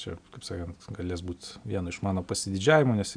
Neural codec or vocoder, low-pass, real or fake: none; 9.9 kHz; real